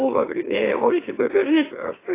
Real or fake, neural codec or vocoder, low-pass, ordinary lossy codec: fake; autoencoder, 44.1 kHz, a latent of 192 numbers a frame, MeloTTS; 3.6 kHz; AAC, 16 kbps